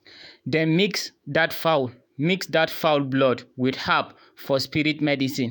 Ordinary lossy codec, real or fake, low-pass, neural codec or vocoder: none; fake; none; autoencoder, 48 kHz, 128 numbers a frame, DAC-VAE, trained on Japanese speech